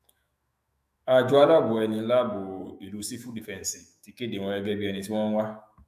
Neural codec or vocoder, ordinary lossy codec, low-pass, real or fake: autoencoder, 48 kHz, 128 numbers a frame, DAC-VAE, trained on Japanese speech; AAC, 96 kbps; 14.4 kHz; fake